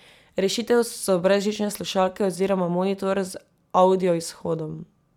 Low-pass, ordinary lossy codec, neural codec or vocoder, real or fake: 19.8 kHz; none; none; real